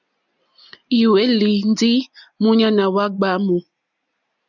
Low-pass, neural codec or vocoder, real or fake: 7.2 kHz; none; real